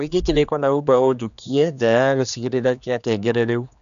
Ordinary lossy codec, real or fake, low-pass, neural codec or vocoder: none; fake; 7.2 kHz; codec, 16 kHz, 2 kbps, X-Codec, HuBERT features, trained on general audio